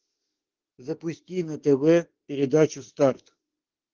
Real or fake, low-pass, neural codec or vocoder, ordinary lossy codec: fake; 7.2 kHz; autoencoder, 48 kHz, 32 numbers a frame, DAC-VAE, trained on Japanese speech; Opus, 16 kbps